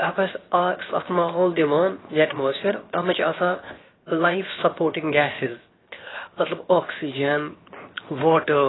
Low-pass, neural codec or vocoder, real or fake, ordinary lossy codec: 7.2 kHz; codec, 16 kHz, 0.7 kbps, FocalCodec; fake; AAC, 16 kbps